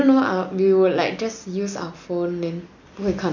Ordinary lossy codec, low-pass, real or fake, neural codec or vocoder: none; 7.2 kHz; real; none